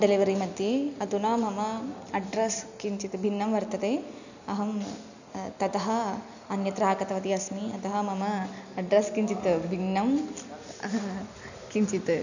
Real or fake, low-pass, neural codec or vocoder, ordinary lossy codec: real; 7.2 kHz; none; none